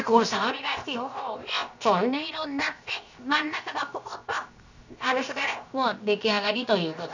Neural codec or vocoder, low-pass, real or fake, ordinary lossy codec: codec, 16 kHz, 0.7 kbps, FocalCodec; 7.2 kHz; fake; none